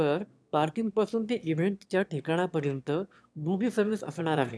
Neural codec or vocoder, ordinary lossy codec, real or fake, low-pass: autoencoder, 22.05 kHz, a latent of 192 numbers a frame, VITS, trained on one speaker; none; fake; none